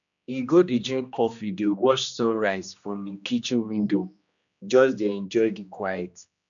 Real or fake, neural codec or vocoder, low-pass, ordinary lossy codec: fake; codec, 16 kHz, 1 kbps, X-Codec, HuBERT features, trained on general audio; 7.2 kHz; MP3, 96 kbps